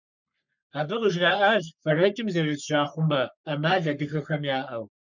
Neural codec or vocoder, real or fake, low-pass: codec, 44.1 kHz, 3.4 kbps, Pupu-Codec; fake; 7.2 kHz